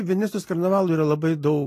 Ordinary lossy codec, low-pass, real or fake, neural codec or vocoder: AAC, 48 kbps; 14.4 kHz; real; none